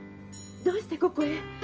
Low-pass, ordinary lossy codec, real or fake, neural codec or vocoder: 7.2 kHz; Opus, 24 kbps; real; none